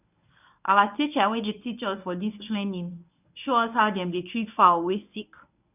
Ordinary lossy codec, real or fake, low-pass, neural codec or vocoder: none; fake; 3.6 kHz; codec, 24 kHz, 0.9 kbps, WavTokenizer, medium speech release version 1